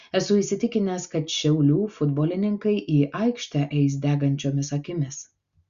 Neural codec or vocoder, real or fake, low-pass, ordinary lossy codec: none; real; 7.2 kHz; Opus, 64 kbps